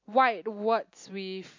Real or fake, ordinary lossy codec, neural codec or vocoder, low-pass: real; MP3, 48 kbps; none; 7.2 kHz